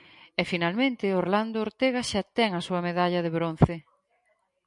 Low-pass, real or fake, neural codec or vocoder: 10.8 kHz; real; none